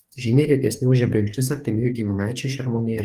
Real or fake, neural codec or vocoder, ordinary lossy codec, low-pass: fake; codec, 44.1 kHz, 2.6 kbps, DAC; Opus, 32 kbps; 14.4 kHz